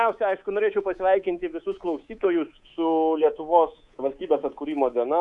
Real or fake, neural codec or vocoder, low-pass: fake; codec, 24 kHz, 3.1 kbps, DualCodec; 10.8 kHz